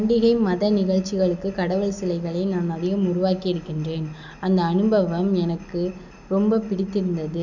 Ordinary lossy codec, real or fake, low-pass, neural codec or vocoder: none; real; 7.2 kHz; none